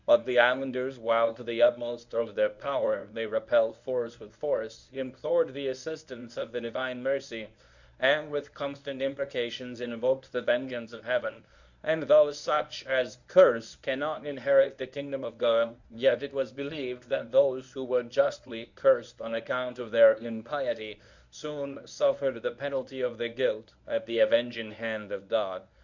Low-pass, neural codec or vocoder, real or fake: 7.2 kHz; codec, 24 kHz, 0.9 kbps, WavTokenizer, medium speech release version 1; fake